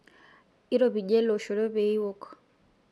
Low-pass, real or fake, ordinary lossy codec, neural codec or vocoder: none; real; none; none